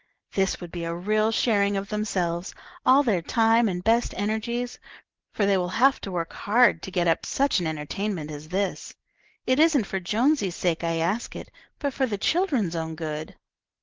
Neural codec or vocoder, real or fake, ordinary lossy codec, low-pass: none; real; Opus, 16 kbps; 7.2 kHz